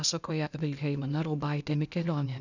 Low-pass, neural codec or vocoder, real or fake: 7.2 kHz; codec, 16 kHz, 0.8 kbps, ZipCodec; fake